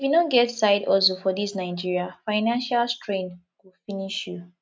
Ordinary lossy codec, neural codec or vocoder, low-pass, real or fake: none; none; none; real